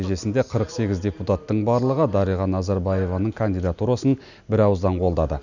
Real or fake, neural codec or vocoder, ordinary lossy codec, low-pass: real; none; none; 7.2 kHz